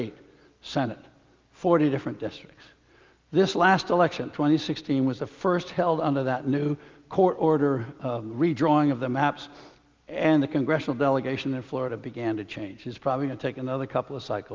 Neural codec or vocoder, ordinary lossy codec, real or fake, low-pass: none; Opus, 24 kbps; real; 7.2 kHz